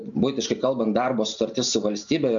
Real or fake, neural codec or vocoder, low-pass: real; none; 7.2 kHz